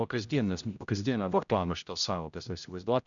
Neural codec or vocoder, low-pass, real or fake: codec, 16 kHz, 0.5 kbps, X-Codec, HuBERT features, trained on general audio; 7.2 kHz; fake